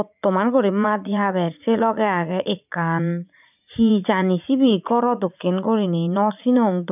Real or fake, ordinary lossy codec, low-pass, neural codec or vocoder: fake; none; 3.6 kHz; vocoder, 44.1 kHz, 80 mel bands, Vocos